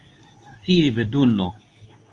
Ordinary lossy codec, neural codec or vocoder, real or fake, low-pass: Opus, 32 kbps; codec, 24 kHz, 0.9 kbps, WavTokenizer, medium speech release version 2; fake; 10.8 kHz